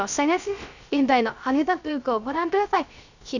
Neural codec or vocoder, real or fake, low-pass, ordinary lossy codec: codec, 16 kHz, 0.3 kbps, FocalCodec; fake; 7.2 kHz; none